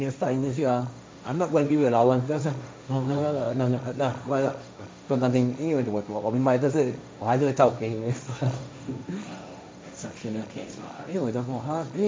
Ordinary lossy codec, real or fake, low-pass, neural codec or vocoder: none; fake; none; codec, 16 kHz, 1.1 kbps, Voila-Tokenizer